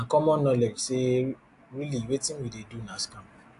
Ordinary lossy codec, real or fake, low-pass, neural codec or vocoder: AAC, 64 kbps; real; 10.8 kHz; none